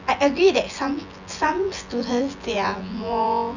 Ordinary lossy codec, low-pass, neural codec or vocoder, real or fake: none; 7.2 kHz; vocoder, 24 kHz, 100 mel bands, Vocos; fake